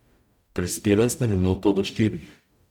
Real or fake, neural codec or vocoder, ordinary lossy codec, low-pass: fake; codec, 44.1 kHz, 0.9 kbps, DAC; none; 19.8 kHz